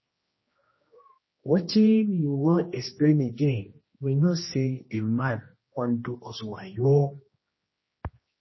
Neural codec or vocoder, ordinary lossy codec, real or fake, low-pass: codec, 16 kHz, 1 kbps, X-Codec, HuBERT features, trained on general audio; MP3, 24 kbps; fake; 7.2 kHz